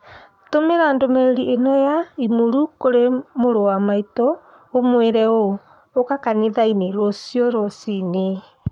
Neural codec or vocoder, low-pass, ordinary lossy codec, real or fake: codec, 44.1 kHz, 7.8 kbps, Pupu-Codec; 19.8 kHz; none; fake